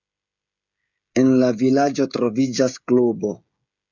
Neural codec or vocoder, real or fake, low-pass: codec, 16 kHz, 16 kbps, FreqCodec, smaller model; fake; 7.2 kHz